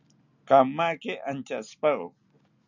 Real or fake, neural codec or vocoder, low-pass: real; none; 7.2 kHz